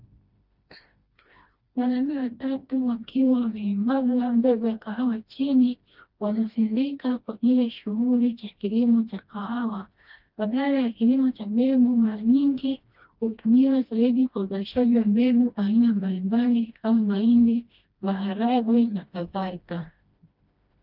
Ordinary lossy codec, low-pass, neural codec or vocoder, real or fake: Opus, 24 kbps; 5.4 kHz; codec, 16 kHz, 1 kbps, FreqCodec, smaller model; fake